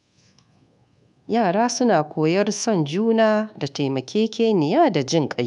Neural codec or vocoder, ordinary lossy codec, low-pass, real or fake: codec, 24 kHz, 1.2 kbps, DualCodec; none; 10.8 kHz; fake